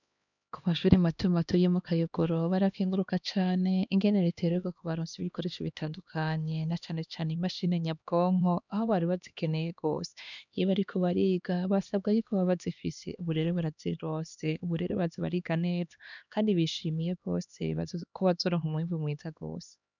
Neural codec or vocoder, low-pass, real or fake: codec, 16 kHz, 2 kbps, X-Codec, HuBERT features, trained on LibriSpeech; 7.2 kHz; fake